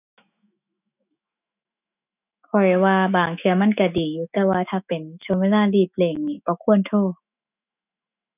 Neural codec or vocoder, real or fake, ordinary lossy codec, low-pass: autoencoder, 48 kHz, 128 numbers a frame, DAC-VAE, trained on Japanese speech; fake; none; 3.6 kHz